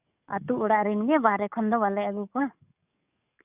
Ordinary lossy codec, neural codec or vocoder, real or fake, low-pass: none; codec, 44.1 kHz, 7.8 kbps, DAC; fake; 3.6 kHz